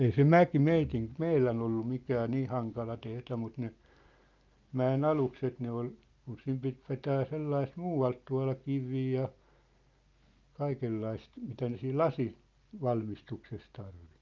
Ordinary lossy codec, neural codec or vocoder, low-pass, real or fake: Opus, 24 kbps; none; 7.2 kHz; real